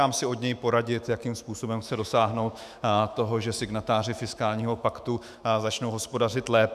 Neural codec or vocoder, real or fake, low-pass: autoencoder, 48 kHz, 128 numbers a frame, DAC-VAE, trained on Japanese speech; fake; 14.4 kHz